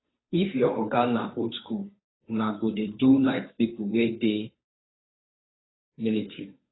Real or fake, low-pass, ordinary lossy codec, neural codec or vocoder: fake; 7.2 kHz; AAC, 16 kbps; codec, 16 kHz, 2 kbps, FunCodec, trained on Chinese and English, 25 frames a second